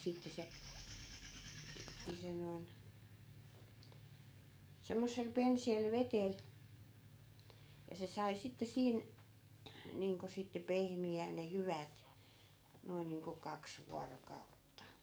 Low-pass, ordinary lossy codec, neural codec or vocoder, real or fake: none; none; codec, 44.1 kHz, 7.8 kbps, DAC; fake